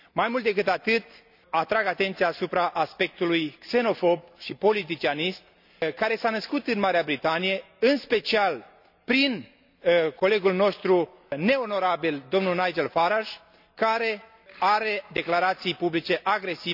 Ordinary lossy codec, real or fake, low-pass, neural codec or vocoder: none; real; 5.4 kHz; none